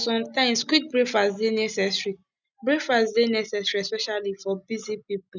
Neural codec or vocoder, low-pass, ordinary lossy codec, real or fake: none; 7.2 kHz; none; real